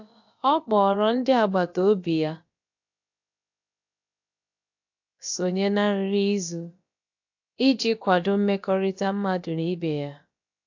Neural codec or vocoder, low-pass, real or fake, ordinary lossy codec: codec, 16 kHz, about 1 kbps, DyCAST, with the encoder's durations; 7.2 kHz; fake; AAC, 48 kbps